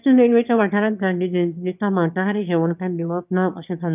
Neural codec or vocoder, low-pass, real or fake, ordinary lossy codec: autoencoder, 22.05 kHz, a latent of 192 numbers a frame, VITS, trained on one speaker; 3.6 kHz; fake; none